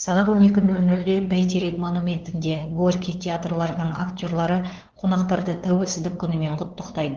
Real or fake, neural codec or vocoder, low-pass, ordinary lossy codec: fake; codec, 16 kHz, 2 kbps, FunCodec, trained on LibriTTS, 25 frames a second; 7.2 kHz; Opus, 16 kbps